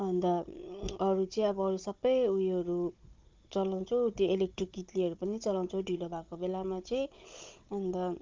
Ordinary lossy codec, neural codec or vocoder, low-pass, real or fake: Opus, 24 kbps; codec, 16 kHz, 16 kbps, FunCodec, trained on Chinese and English, 50 frames a second; 7.2 kHz; fake